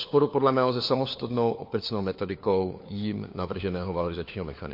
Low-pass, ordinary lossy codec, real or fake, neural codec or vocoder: 5.4 kHz; MP3, 32 kbps; fake; codec, 16 kHz, 4 kbps, FunCodec, trained on LibriTTS, 50 frames a second